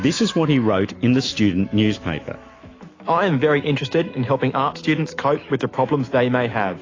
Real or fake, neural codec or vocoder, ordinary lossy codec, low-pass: fake; autoencoder, 48 kHz, 128 numbers a frame, DAC-VAE, trained on Japanese speech; AAC, 32 kbps; 7.2 kHz